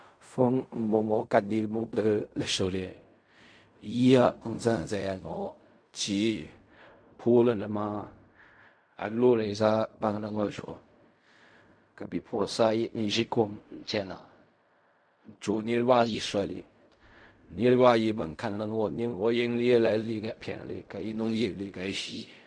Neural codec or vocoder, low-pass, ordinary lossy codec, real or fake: codec, 16 kHz in and 24 kHz out, 0.4 kbps, LongCat-Audio-Codec, fine tuned four codebook decoder; 9.9 kHz; MP3, 64 kbps; fake